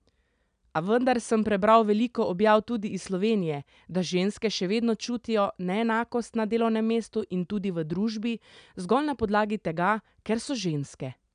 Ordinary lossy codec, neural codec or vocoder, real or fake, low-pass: none; none; real; 9.9 kHz